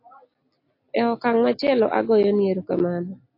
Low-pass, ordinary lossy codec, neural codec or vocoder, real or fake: 5.4 kHz; AAC, 32 kbps; none; real